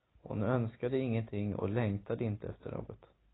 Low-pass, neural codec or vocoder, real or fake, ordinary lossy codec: 7.2 kHz; none; real; AAC, 16 kbps